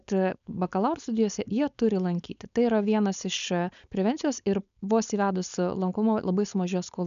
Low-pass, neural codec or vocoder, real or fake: 7.2 kHz; codec, 16 kHz, 4.8 kbps, FACodec; fake